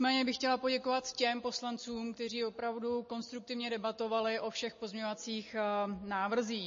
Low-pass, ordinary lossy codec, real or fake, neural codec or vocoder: 7.2 kHz; MP3, 32 kbps; real; none